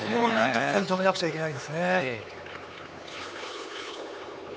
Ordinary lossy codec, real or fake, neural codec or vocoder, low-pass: none; fake; codec, 16 kHz, 4 kbps, X-Codec, HuBERT features, trained on LibriSpeech; none